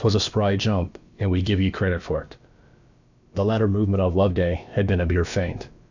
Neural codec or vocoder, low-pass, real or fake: codec, 16 kHz, about 1 kbps, DyCAST, with the encoder's durations; 7.2 kHz; fake